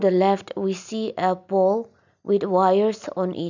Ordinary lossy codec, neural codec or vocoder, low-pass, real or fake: none; none; 7.2 kHz; real